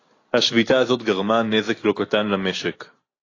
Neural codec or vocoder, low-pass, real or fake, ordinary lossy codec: none; 7.2 kHz; real; AAC, 32 kbps